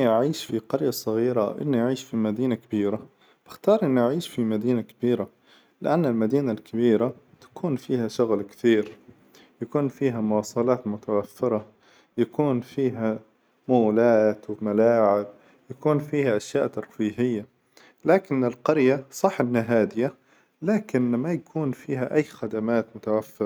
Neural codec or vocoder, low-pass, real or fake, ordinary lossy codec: none; none; real; none